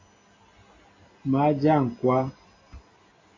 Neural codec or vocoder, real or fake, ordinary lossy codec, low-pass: none; real; AAC, 32 kbps; 7.2 kHz